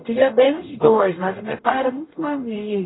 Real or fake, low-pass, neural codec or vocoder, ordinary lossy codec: fake; 7.2 kHz; codec, 44.1 kHz, 0.9 kbps, DAC; AAC, 16 kbps